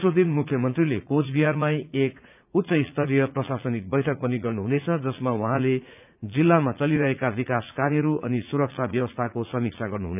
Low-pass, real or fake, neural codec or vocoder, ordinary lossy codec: 3.6 kHz; fake; vocoder, 44.1 kHz, 80 mel bands, Vocos; none